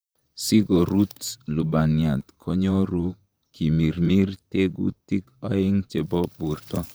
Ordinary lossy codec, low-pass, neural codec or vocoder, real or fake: none; none; vocoder, 44.1 kHz, 128 mel bands, Pupu-Vocoder; fake